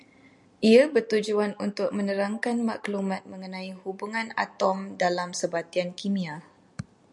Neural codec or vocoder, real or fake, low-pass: none; real; 10.8 kHz